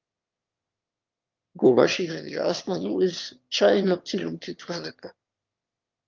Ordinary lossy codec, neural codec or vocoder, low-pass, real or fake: Opus, 24 kbps; autoencoder, 22.05 kHz, a latent of 192 numbers a frame, VITS, trained on one speaker; 7.2 kHz; fake